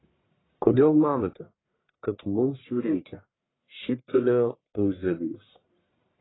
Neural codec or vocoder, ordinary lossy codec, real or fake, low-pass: codec, 44.1 kHz, 1.7 kbps, Pupu-Codec; AAC, 16 kbps; fake; 7.2 kHz